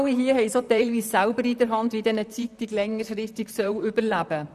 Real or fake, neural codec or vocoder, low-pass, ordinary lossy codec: fake; vocoder, 44.1 kHz, 128 mel bands, Pupu-Vocoder; 14.4 kHz; none